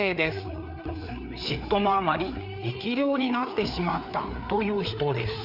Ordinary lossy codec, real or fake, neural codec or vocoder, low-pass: none; fake; codec, 16 kHz, 4 kbps, FreqCodec, larger model; 5.4 kHz